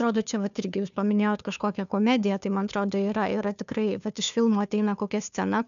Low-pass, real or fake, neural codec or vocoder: 7.2 kHz; fake; codec, 16 kHz, 2 kbps, FunCodec, trained on Chinese and English, 25 frames a second